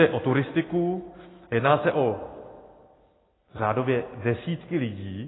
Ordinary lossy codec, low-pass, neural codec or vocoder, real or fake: AAC, 16 kbps; 7.2 kHz; none; real